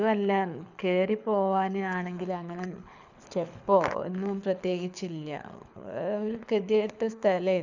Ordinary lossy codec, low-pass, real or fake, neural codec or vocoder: none; 7.2 kHz; fake; codec, 24 kHz, 6 kbps, HILCodec